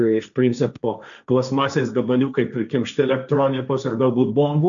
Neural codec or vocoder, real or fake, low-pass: codec, 16 kHz, 1.1 kbps, Voila-Tokenizer; fake; 7.2 kHz